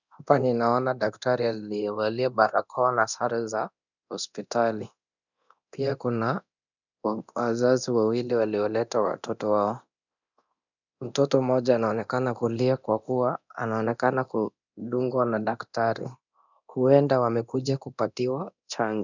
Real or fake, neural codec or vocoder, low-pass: fake; codec, 24 kHz, 0.9 kbps, DualCodec; 7.2 kHz